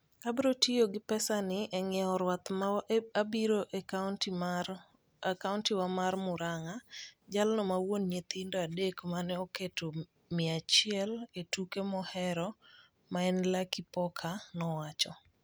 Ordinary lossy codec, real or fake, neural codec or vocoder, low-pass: none; real; none; none